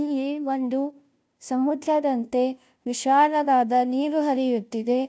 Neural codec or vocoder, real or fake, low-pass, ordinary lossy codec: codec, 16 kHz, 0.5 kbps, FunCodec, trained on Chinese and English, 25 frames a second; fake; none; none